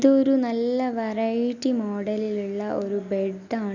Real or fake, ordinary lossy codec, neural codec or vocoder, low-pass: real; none; none; 7.2 kHz